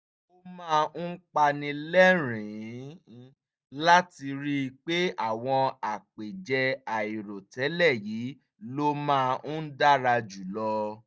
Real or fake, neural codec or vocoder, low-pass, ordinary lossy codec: real; none; none; none